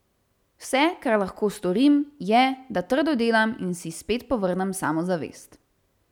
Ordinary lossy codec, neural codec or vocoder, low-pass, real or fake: none; none; 19.8 kHz; real